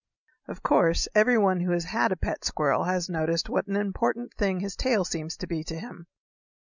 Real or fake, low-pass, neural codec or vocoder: real; 7.2 kHz; none